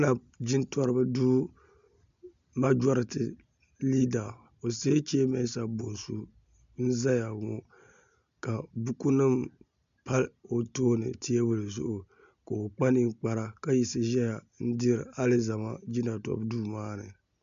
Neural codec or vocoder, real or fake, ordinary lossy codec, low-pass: none; real; MP3, 64 kbps; 7.2 kHz